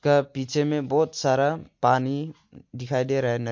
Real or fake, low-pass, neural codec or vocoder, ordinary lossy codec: real; 7.2 kHz; none; MP3, 48 kbps